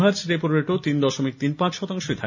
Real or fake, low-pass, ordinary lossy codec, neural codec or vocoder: real; 7.2 kHz; none; none